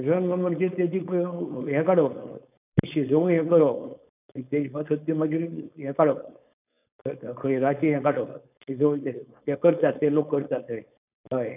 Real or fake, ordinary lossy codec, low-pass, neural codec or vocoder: fake; none; 3.6 kHz; codec, 16 kHz, 4.8 kbps, FACodec